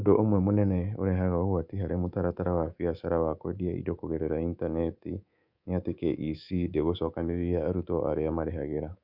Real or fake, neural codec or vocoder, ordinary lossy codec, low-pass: fake; codec, 24 kHz, 3.1 kbps, DualCodec; Opus, 64 kbps; 5.4 kHz